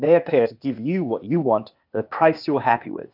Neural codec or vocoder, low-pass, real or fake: codec, 16 kHz, 0.8 kbps, ZipCodec; 5.4 kHz; fake